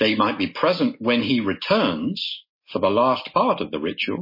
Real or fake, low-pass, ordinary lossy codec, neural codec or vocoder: real; 5.4 kHz; MP3, 24 kbps; none